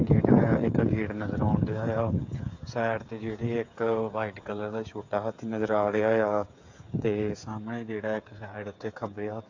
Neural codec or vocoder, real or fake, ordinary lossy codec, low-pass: codec, 16 kHz, 8 kbps, FreqCodec, smaller model; fake; AAC, 48 kbps; 7.2 kHz